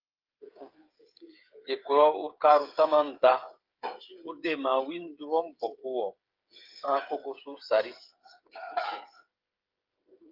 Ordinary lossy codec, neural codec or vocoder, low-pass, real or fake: Opus, 24 kbps; codec, 16 kHz, 8 kbps, FreqCodec, smaller model; 5.4 kHz; fake